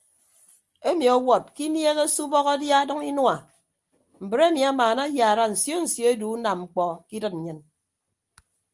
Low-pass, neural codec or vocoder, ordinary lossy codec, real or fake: 10.8 kHz; none; Opus, 32 kbps; real